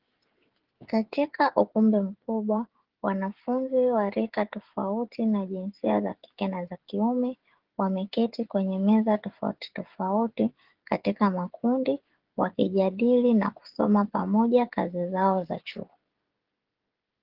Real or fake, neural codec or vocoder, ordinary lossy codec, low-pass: fake; codec, 16 kHz, 16 kbps, FreqCodec, smaller model; Opus, 16 kbps; 5.4 kHz